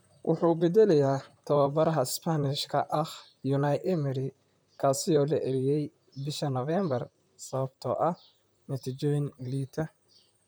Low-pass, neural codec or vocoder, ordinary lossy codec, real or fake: none; vocoder, 44.1 kHz, 128 mel bands, Pupu-Vocoder; none; fake